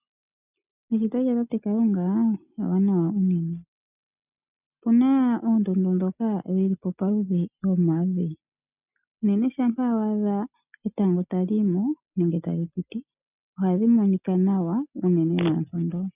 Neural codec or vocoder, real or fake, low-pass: none; real; 3.6 kHz